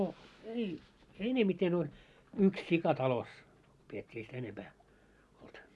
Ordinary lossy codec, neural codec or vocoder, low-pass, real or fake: none; none; none; real